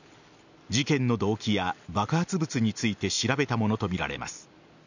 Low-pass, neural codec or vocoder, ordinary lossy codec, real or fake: 7.2 kHz; none; none; real